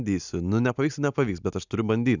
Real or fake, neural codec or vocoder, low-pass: real; none; 7.2 kHz